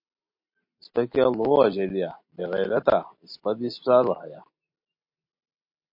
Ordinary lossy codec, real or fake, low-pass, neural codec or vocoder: MP3, 32 kbps; real; 5.4 kHz; none